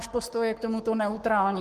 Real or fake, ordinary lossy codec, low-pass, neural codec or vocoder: fake; Opus, 16 kbps; 14.4 kHz; codec, 44.1 kHz, 7.8 kbps, Pupu-Codec